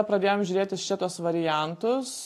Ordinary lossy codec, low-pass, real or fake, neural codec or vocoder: AAC, 64 kbps; 14.4 kHz; real; none